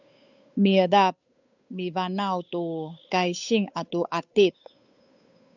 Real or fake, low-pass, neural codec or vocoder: fake; 7.2 kHz; codec, 16 kHz, 8 kbps, FunCodec, trained on Chinese and English, 25 frames a second